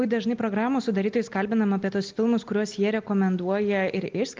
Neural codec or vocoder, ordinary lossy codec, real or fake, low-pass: none; Opus, 32 kbps; real; 7.2 kHz